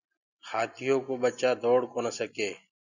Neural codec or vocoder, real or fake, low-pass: none; real; 7.2 kHz